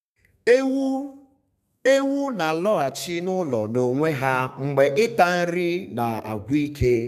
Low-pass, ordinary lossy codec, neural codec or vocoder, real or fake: 14.4 kHz; none; codec, 32 kHz, 1.9 kbps, SNAC; fake